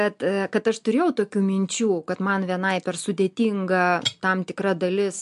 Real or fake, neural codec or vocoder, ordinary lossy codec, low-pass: real; none; MP3, 64 kbps; 10.8 kHz